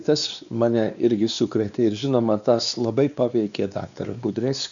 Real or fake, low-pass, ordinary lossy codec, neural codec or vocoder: fake; 7.2 kHz; MP3, 96 kbps; codec, 16 kHz, 2 kbps, X-Codec, WavLM features, trained on Multilingual LibriSpeech